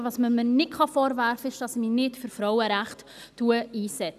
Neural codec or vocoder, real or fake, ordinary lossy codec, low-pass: none; real; none; 14.4 kHz